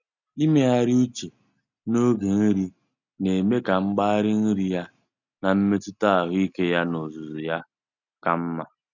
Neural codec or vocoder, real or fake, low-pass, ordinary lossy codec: none; real; 7.2 kHz; none